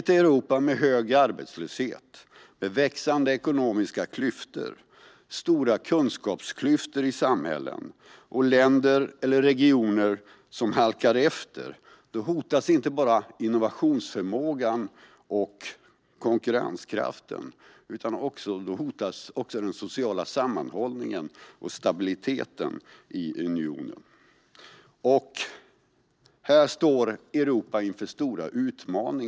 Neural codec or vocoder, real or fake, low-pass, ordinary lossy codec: none; real; none; none